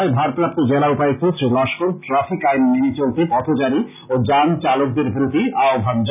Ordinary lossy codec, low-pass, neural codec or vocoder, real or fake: none; 3.6 kHz; none; real